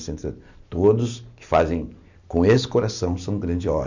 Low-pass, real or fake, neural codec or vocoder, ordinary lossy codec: 7.2 kHz; real; none; none